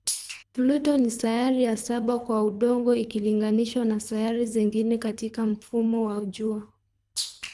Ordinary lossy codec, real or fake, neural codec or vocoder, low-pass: none; fake; codec, 24 kHz, 3 kbps, HILCodec; none